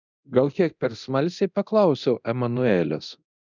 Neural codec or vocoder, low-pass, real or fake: codec, 24 kHz, 0.9 kbps, DualCodec; 7.2 kHz; fake